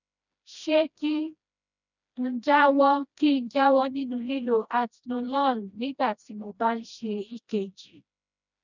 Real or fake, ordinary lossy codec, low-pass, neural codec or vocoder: fake; none; 7.2 kHz; codec, 16 kHz, 1 kbps, FreqCodec, smaller model